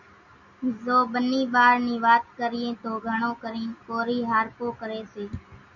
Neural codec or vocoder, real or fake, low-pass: none; real; 7.2 kHz